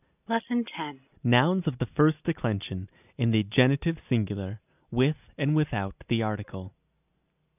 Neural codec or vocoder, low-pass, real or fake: none; 3.6 kHz; real